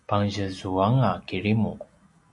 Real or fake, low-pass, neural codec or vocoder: real; 10.8 kHz; none